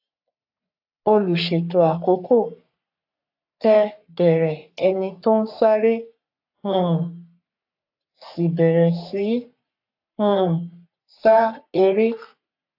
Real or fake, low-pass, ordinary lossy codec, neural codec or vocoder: fake; 5.4 kHz; AAC, 48 kbps; codec, 44.1 kHz, 3.4 kbps, Pupu-Codec